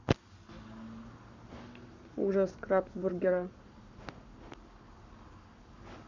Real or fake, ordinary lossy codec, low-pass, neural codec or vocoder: fake; none; 7.2 kHz; codec, 44.1 kHz, 7.8 kbps, Pupu-Codec